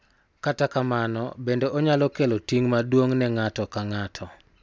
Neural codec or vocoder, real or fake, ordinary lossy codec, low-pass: none; real; none; none